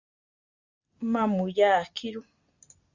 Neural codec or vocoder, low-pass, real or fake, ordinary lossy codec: none; 7.2 kHz; real; Opus, 64 kbps